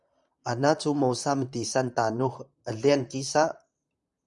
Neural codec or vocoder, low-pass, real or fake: vocoder, 44.1 kHz, 128 mel bands, Pupu-Vocoder; 10.8 kHz; fake